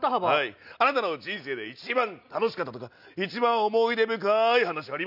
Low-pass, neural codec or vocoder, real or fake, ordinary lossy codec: 5.4 kHz; none; real; none